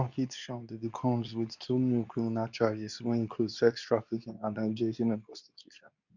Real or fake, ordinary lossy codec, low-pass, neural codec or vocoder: fake; none; 7.2 kHz; codec, 24 kHz, 0.9 kbps, WavTokenizer, medium speech release version 2